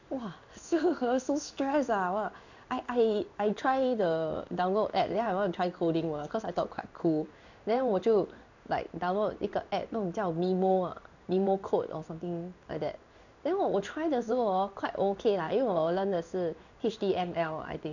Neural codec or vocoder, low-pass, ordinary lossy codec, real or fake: codec, 16 kHz in and 24 kHz out, 1 kbps, XY-Tokenizer; 7.2 kHz; none; fake